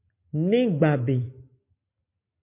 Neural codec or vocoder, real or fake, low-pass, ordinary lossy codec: none; real; 3.6 kHz; MP3, 32 kbps